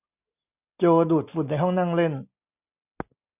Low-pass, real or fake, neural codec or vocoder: 3.6 kHz; real; none